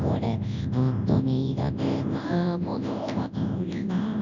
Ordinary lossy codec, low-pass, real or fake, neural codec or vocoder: none; 7.2 kHz; fake; codec, 24 kHz, 0.9 kbps, WavTokenizer, large speech release